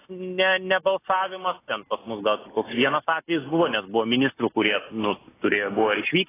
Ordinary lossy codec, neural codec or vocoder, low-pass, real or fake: AAC, 16 kbps; none; 3.6 kHz; real